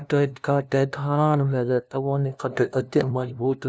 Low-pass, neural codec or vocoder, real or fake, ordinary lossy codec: none; codec, 16 kHz, 0.5 kbps, FunCodec, trained on LibriTTS, 25 frames a second; fake; none